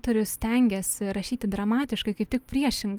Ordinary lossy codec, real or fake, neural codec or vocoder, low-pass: Opus, 32 kbps; real; none; 19.8 kHz